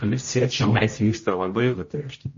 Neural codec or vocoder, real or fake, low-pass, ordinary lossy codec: codec, 16 kHz, 0.5 kbps, X-Codec, HuBERT features, trained on general audio; fake; 7.2 kHz; MP3, 32 kbps